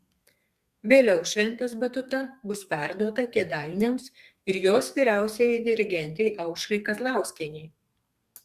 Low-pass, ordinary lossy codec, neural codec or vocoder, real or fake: 14.4 kHz; Opus, 64 kbps; codec, 32 kHz, 1.9 kbps, SNAC; fake